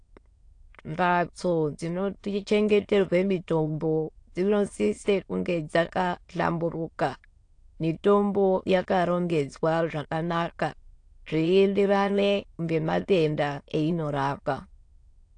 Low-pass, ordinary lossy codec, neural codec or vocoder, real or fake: 9.9 kHz; AAC, 48 kbps; autoencoder, 22.05 kHz, a latent of 192 numbers a frame, VITS, trained on many speakers; fake